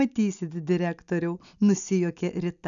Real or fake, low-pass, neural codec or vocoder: real; 7.2 kHz; none